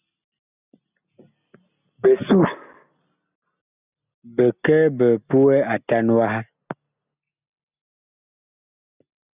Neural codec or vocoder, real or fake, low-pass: none; real; 3.6 kHz